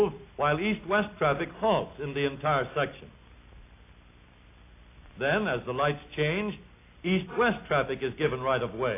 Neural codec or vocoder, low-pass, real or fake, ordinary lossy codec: none; 3.6 kHz; real; AAC, 24 kbps